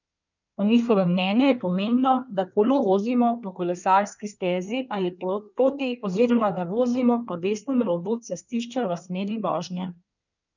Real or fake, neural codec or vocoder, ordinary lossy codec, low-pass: fake; codec, 24 kHz, 1 kbps, SNAC; none; 7.2 kHz